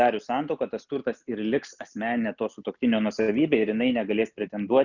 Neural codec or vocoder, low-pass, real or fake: none; 7.2 kHz; real